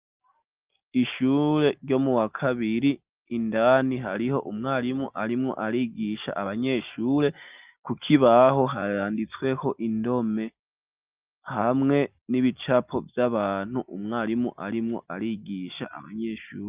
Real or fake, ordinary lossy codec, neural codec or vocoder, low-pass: real; Opus, 32 kbps; none; 3.6 kHz